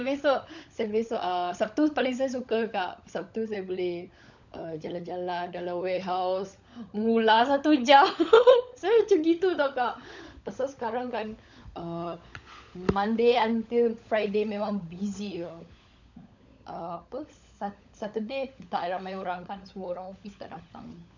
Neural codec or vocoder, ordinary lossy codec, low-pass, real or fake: codec, 16 kHz, 16 kbps, FunCodec, trained on LibriTTS, 50 frames a second; none; 7.2 kHz; fake